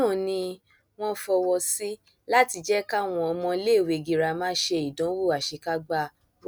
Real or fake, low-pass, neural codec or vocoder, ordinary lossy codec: real; none; none; none